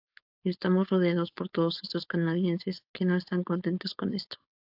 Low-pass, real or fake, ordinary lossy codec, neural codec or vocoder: 5.4 kHz; fake; AAC, 48 kbps; codec, 16 kHz, 4.8 kbps, FACodec